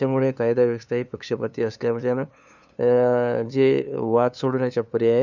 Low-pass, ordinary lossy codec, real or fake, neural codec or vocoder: 7.2 kHz; none; fake; codec, 16 kHz, 2 kbps, FunCodec, trained on LibriTTS, 25 frames a second